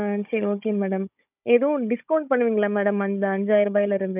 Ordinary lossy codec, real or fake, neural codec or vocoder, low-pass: none; fake; codec, 16 kHz, 16 kbps, FunCodec, trained on Chinese and English, 50 frames a second; 3.6 kHz